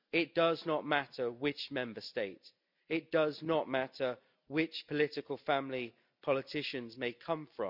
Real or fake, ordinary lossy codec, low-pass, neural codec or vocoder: real; none; 5.4 kHz; none